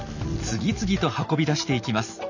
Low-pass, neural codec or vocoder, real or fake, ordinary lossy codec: 7.2 kHz; none; real; none